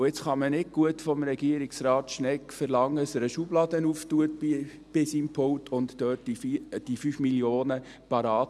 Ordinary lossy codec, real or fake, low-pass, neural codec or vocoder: none; real; none; none